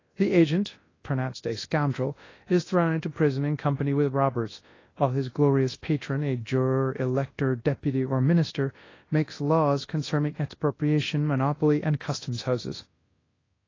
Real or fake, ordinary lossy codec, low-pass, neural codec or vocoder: fake; AAC, 32 kbps; 7.2 kHz; codec, 24 kHz, 0.9 kbps, WavTokenizer, large speech release